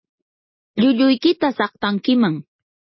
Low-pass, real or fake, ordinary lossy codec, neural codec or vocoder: 7.2 kHz; real; MP3, 24 kbps; none